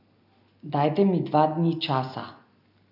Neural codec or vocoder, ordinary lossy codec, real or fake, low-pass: none; none; real; 5.4 kHz